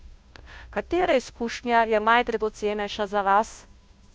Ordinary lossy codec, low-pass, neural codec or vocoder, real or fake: none; none; codec, 16 kHz, 0.5 kbps, FunCodec, trained on Chinese and English, 25 frames a second; fake